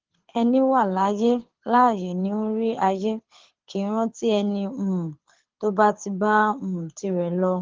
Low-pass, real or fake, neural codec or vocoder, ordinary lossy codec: 7.2 kHz; fake; codec, 24 kHz, 6 kbps, HILCodec; Opus, 16 kbps